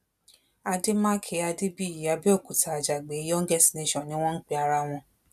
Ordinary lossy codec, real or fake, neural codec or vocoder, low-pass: none; real; none; 14.4 kHz